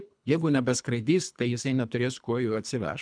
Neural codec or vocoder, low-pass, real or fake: codec, 24 kHz, 3 kbps, HILCodec; 9.9 kHz; fake